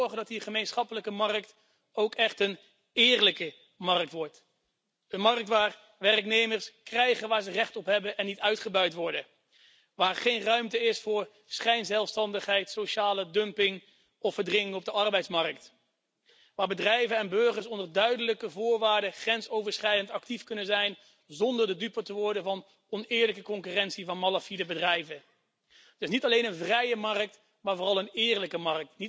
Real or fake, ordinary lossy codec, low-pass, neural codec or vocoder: real; none; none; none